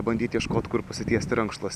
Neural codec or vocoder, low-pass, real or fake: none; 14.4 kHz; real